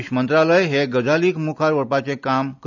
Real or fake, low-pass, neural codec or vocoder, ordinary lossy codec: real; 7.2 kHz; none; none